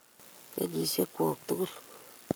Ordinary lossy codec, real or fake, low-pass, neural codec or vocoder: none; fake; none; codec, 44.1 kHz, 7.8 kbps, Pupu-Codec